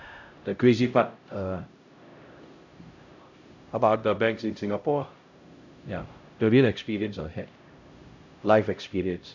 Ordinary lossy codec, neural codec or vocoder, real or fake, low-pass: none; codec, 16 kHz, 0.5 kbps, X-Codec, HuBERT features, trained on LibriSpeech; fake; 7.2 kHz